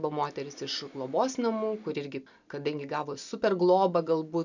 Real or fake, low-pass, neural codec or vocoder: real; 7.2 kHz; none